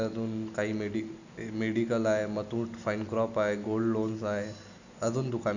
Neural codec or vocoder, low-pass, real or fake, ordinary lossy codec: none; 7.2 kHz; real; none